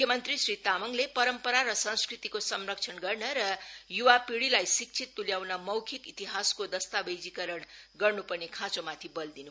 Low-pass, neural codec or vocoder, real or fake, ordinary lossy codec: none; none; real; none